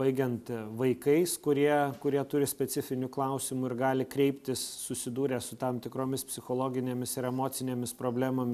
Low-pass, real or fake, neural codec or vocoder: 14.4 kHz; real; none